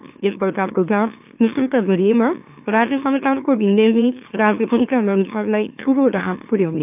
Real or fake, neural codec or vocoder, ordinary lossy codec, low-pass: fake; autoencoder, 44.1 kHz, a latent of 192 numbers a frame, MeloTTS; AAC, 32 kbps; 3.6 kHz